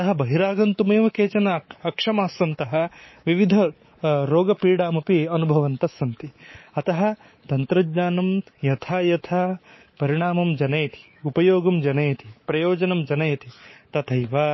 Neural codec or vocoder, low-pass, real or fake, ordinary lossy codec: codec, 24 kHz, 3.1 kbps, DualCodec; 7.2 kHz; fake; MP3, 24 kbps